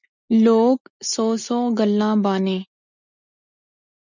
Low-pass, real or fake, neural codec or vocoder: 7.2 kHz; real; none